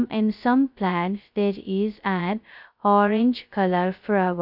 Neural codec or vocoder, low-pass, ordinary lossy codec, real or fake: codec, 16 kHz, 0.2 kbps, FocalCodec; 5.4 kHz; none; fake